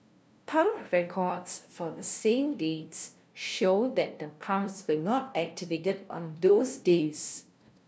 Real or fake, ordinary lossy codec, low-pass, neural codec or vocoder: fake; none; none; codec, 16 kHz, 0.5 kbps, FunCodec, trained on LibriTTS, 25 frames a second